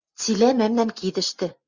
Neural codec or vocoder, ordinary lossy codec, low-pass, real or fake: none; Opus, 64 kbps; 7.2 kHz; real